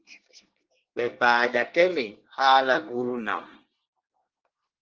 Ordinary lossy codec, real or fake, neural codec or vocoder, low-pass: Opus, 16 kbps; fake; codec, 24 kHz, 1 kbps, SNAC; 7.2 kHz